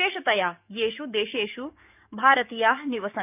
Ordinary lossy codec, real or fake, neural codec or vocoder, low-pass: none; fake; codec, 44.1 kHz, 7.8 kbps, DAC; 3.6 kHz